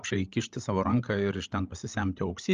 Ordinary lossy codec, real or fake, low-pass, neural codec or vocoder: Opus, 32 kbps; fake; 7.2 kHz; codec, 16 kHz, 16 kbps, FreqCodec, larger model